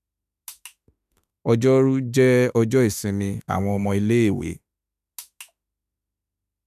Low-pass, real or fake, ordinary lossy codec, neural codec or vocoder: 14.4 kHz; fake; none; autoencoder, 48 kHz, 32 numbers a frame, DAC-VAE, trained on Japanese speech